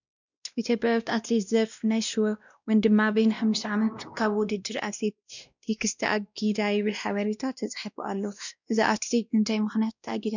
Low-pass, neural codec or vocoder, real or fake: 7.2 kHz; codec, 16 kHz, 1 kbps, X-Codec, WavLM features, trained on Multilingual LibriSpeech; fake